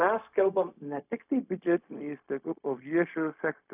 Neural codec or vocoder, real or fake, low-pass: codec, 16 kHz, 0.4 kbps, LongCat-Audio-Codec; fake; 3.6 kHz